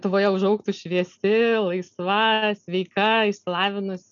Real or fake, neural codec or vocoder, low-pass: real; none; 7.2 kHz